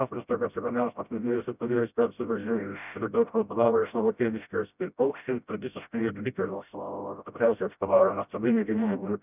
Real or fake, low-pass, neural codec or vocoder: fake; 3.6 kHz; codec, 16 kHz, 0.5 kbps, FreqCodec, smaller model